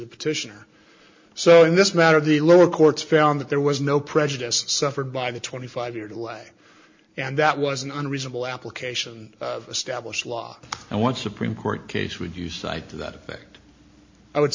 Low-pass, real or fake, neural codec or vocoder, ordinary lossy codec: 7.2 kHz; real; none; MP3, 48 kbps